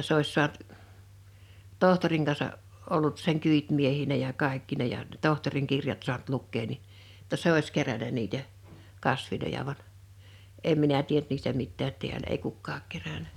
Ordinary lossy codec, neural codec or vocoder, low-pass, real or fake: none; none; 19.8 kHz; real